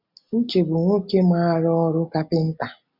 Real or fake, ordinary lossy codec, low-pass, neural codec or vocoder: real; none; 5.4 kHz; none